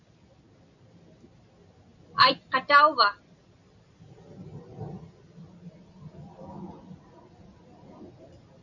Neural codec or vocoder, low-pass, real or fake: none; 7.2 kHz; real